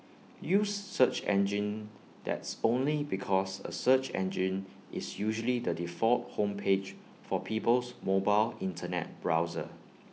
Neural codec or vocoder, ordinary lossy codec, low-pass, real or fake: none; none; none; real